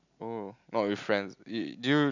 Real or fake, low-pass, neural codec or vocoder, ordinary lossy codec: real; 7.2 kHz; none; none